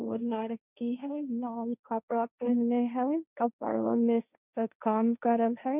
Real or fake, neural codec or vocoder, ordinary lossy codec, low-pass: fake; codec, 16 kHz, 1.1 kbps, Voila-Tokenizer; none; 3.6 kHz